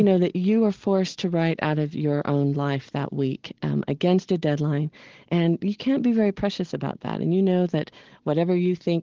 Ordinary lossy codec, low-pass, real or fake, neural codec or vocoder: Opus, 16 kbps; 7.2 kHz; real; none